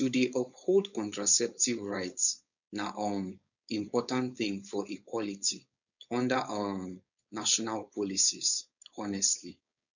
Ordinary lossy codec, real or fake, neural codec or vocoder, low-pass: none; fake; codec, 16 kHz, 4.8 kbps, FACodec; 7.2 kHz